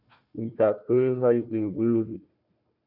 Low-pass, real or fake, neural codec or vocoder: 5.4 kHz; fake; codec, 16 kHz, 1 kbps, FunCodec, trained on Chinese and English, 50 frames a second